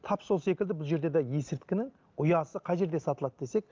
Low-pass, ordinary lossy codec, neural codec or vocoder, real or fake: 7.2 kHz; Opus, 32 kbps; none; real